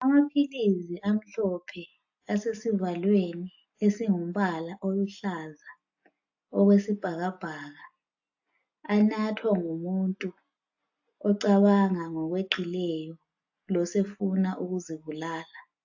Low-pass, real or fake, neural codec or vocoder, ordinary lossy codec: 7.2 kHz; real; none; AAC, 48 kbps